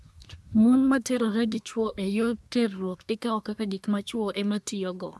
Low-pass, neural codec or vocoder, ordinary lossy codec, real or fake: none; codec, 24 kHz, 1 kbps, SNAC; none; fake